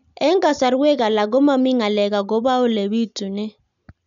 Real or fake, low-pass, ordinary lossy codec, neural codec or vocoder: real; 7.2 kHz; none; none